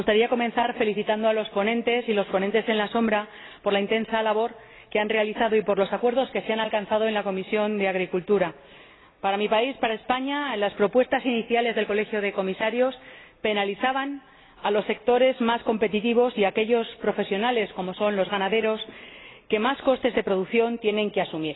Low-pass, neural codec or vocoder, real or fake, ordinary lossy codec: 7.2 kHz; none; real; AAC, 16 kbps